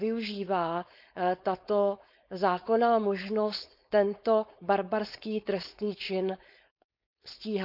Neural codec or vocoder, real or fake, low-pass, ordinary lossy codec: codec, 16 kHz, 4.8 kbps, FACodec; fake; 5.4 kHz; none